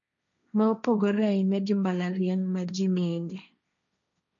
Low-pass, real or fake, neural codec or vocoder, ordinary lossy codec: 7.2 kHz; fake; codec, 16 kHz, 1.1 kbps, Voila-Tokenizer; none